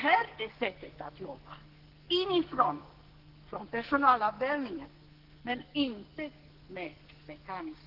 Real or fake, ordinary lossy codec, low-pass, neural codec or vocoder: fake; Opus, 16 kbps; 5.4 kHz; codec, 44.1 kHz, 2.6 kbps, SNAC